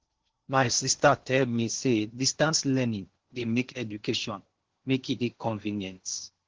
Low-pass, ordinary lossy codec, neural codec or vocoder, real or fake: 7.2 kHz; Opus, 16 kbps; codec, 16 kHz in and 24 kHz out, 0.8 kbps, FocalCodec, streaming, 65536 codes; fake